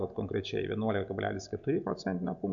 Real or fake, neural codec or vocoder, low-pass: real; none; 7.2 kHz